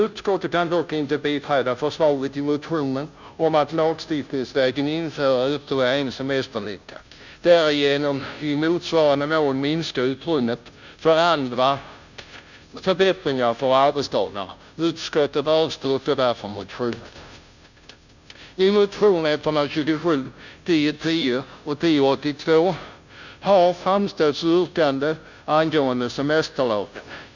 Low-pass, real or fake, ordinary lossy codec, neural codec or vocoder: 7.2 kHz; fake; none; codec, 16 kHz, 0.5 kbps, FunCodec, trained on Chinese and English, 25 frames a second